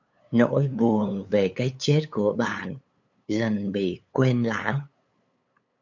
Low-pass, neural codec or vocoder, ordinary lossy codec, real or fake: 7.2 kHz; codec, 16 kHz, 8 kbps, FunCodec, trained on LibriTTS, 25 frames a second; MP3, 64 kbps; fake